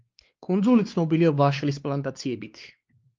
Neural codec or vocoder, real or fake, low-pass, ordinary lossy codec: codec, 16 kHz, 2 kbps, X-Codec, WavLM features, trained on Multilingual LibriSpeech; fake; 7.2 kHz; Opus, 24 kbps